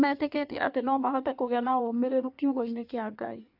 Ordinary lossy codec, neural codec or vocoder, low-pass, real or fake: AAC, 48 kbps; codec, 16 kHz in and 24 kHz out, 1.1 kbps, FireRedTTS-2 codec; 5.4 kHz; fake